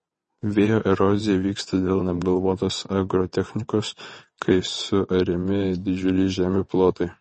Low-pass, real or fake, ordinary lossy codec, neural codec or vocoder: 9.9 kHz; fake; MP3, 32 kbps; vocoder, 22.05 kHz, 80 mel bands, WaveNeXt